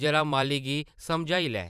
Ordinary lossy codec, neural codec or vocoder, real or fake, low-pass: none; vocoder, 44.1 kHz, 128 mel bands every 512 samples, BigVGAN v2; fake; 14.4 kHz